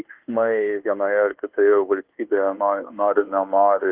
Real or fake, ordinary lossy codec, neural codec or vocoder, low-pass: fake; Opus, 16 kbps; codec, 24 kHz, 1.2 kbps, DualCodec; 3.6 kHz